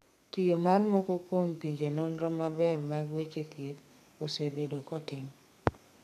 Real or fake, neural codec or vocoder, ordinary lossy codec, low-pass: fake; codec, 32 kHz, 1.9 kbps, SNAC; none; 14.4 kHz